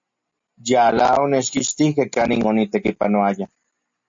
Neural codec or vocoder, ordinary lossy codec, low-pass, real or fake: none; MP3, 48 kbps; 7.2 kHz; real